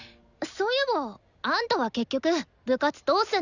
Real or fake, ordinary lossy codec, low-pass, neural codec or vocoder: real; none; 7.2 kHz; none